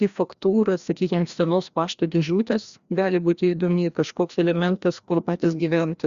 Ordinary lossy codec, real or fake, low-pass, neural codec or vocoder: Opus, 64 kbps; fake; 7.2 kHz; codec, 16 kHz, 1 kbps, FreqCodec, larger model